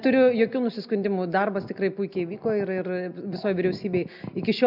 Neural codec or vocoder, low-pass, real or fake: none; 5.4 kHz; real